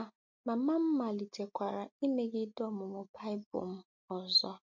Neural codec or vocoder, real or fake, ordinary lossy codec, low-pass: none; real; MP3, 48 kbps; 7.2 kHz